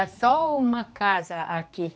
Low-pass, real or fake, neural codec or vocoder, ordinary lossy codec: none; fake; codec, 16 kHz, 2 kbps, X-Codec, HuBERT features, trained on general audio; none